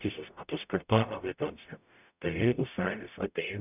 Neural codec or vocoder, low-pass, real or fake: codec, 44.1 kHz, 0.9 kbps, DAC; 3.6 kHz; fake